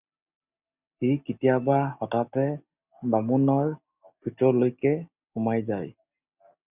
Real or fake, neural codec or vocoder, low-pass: real; none; 3.6 kHz